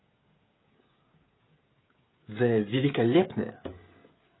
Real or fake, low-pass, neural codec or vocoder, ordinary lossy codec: fake; 7.2 kHz; codec, 16 kHz, 16 kbps, FreqCodec, smaller model; AAC, 16 kbps